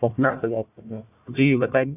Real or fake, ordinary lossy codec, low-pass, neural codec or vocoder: fake; none; 3.6 kHz; codec, 16 kHz, 1 kbps, FunCodec, trained on Chinese and English, 50 frames a second